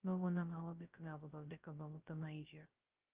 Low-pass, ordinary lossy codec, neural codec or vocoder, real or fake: 3.6 kHz; Opus, 32 kbps; codec, 16 kHz, 0.2 kbps, FocalCodec; fake